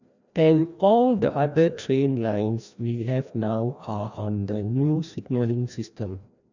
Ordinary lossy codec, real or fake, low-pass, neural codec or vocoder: none; fake; 7.2 kHz; codec, 16 kHz, 1 kbps, FreqCodec, larger model